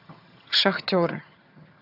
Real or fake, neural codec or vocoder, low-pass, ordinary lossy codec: fake; vocoder, 22.05 kHz, 80 mel bands, HiFi-GAN; 5.4 kHz; AAC, 32 kbps